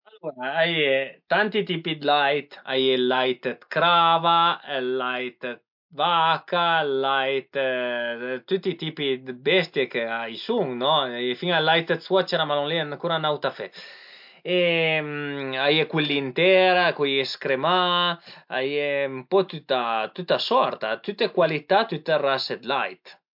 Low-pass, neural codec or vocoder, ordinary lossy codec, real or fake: 5.4 kHz; none; MP3, 48 kbps; real